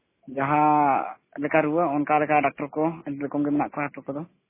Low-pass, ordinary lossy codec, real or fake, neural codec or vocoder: 3.6 kHz; MP3, 16 kbps; real; none